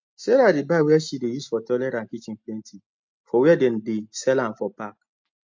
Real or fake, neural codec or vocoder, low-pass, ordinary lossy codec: real; none; 7.2 kHz; MP3, 48 kbps